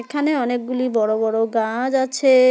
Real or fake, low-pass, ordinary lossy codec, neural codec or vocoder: real; none; none; none